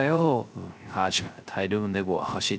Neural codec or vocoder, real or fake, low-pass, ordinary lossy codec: codec, 16 kHz, 0.3 kbps, FocalCodec; fake; none; none